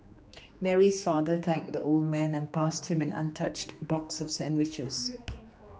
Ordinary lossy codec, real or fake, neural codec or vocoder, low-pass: none; fake; codec, 16 kHz, 2 kbps, X-Codec, HuBERT features, trained on general audio; none